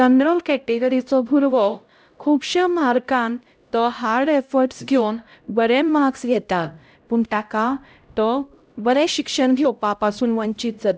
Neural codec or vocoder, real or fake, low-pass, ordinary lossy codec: codec, 16 kHz, 0.5 kbps, X-Codec, HuBERT features, trained on LibriSpeech; fake; none; none